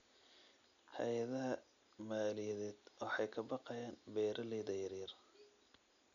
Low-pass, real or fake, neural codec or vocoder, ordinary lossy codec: 7.2 kHz; real; none; none